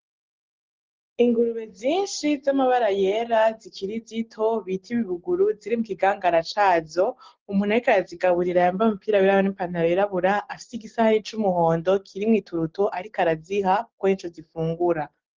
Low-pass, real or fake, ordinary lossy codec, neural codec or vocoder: 7.2 kHz; real; Opus, 16 kbps; none